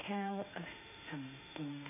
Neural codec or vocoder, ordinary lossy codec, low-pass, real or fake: autoencoder, 48 kHz, 32 numbers a frame, DAC-VAE, trained on Japanese speech; none; 3.6 kHz; fake